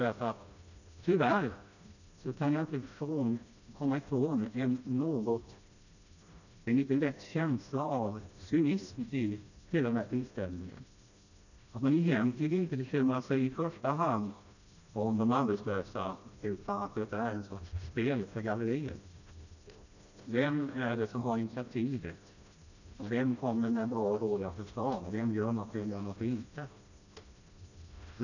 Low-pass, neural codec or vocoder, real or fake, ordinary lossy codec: 7.2 kHz; codec, 16 kHz, 1 kbps, FreqCodec, smaller model; fake; none